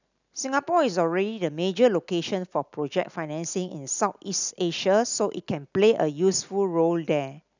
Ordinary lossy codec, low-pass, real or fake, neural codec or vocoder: none; 7.2 kHz; real; none